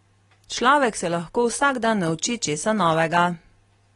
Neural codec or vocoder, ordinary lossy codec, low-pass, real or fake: none; AAC, 32 kbps; 10.8 kHz; real